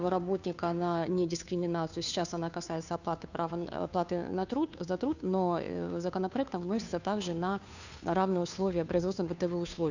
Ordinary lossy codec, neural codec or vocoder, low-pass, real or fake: none; codec, 16 kHz, 2 kbps, FunCodec, trained on Chinese and English, 25 frames a second; 7.2 kHz; fake